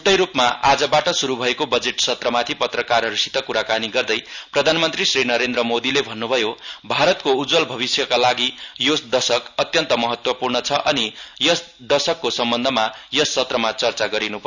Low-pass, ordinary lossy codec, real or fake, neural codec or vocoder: 7.2 kHz; none; real; none